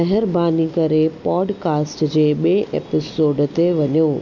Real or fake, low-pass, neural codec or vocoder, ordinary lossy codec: real; 7.2 kHz; none; none